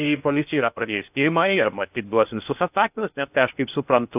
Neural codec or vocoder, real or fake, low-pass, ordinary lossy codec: codec, 16 kHz in and 24 kHz out, 0.6 kbps, FocalCodec, streaming, 2048 codes; fake; 3.6 kHz; AAC, 32 kbps